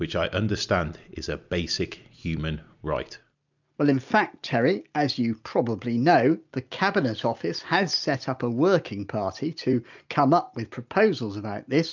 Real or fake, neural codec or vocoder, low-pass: real; none; 7.2 kHz